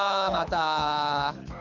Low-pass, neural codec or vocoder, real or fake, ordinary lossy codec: 7.2 kHz; codec, 24 kHz, 6 kbps, HILCodec; fake; MP3, 64 kbps